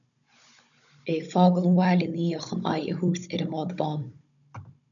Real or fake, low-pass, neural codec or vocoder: fake; 7.2 kHz; codec, 16 kHz, 16 kbps, FunCodec, trained on Chinese and English, 50 frames a second